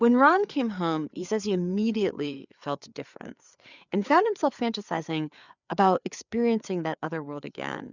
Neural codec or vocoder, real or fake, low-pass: codec, 16 kHz, 4 kbps, FreqCodec, larger model; fake; 7.2 kHz